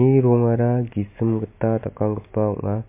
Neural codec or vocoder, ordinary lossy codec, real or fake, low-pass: none; MP3, 24 kbps; real; 3.6 kHz